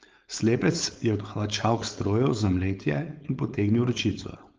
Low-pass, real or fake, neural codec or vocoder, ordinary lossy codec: 7.2 kHz; fake; codec, 16 kHz, 4.8 kbps, FACodec; Opus, 24 kbps